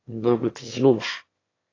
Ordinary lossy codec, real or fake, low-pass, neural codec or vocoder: AAC, 32 kbps; fake; 7.2 kHz; autoencoder, 22.05 kHz, a latent of 192 numbers a frame, VITS, trained on one speaker